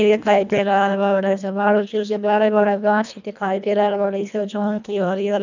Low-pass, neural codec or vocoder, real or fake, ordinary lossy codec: 7.2 kHz; codec, 24 kHz, 1.5 kbps, HILCodec; fake; none